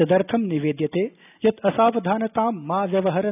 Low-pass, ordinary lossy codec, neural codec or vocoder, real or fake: 3.6 kHz; none; none; real